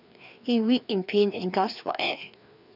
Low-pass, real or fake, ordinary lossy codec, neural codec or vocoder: 5.4 kHz; fake; none; codec, 16 kHz, 2 kbps, FreqCodec, larger model